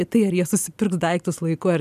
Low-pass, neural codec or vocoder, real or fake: 14.4 kHz; none; real